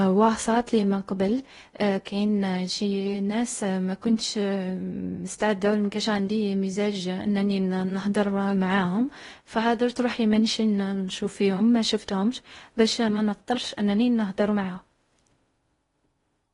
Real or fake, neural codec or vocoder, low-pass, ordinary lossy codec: fake; codec, 16 kHz in and 24 kHz out, 0.6 kbps, FocalCodec, streaming, 2048 codes; 10.8 kHz; AAC, 32 kbps